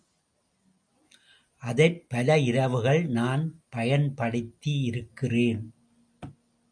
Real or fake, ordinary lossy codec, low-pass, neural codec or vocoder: real; MP3, 96 kbps; 9.9 kHz; none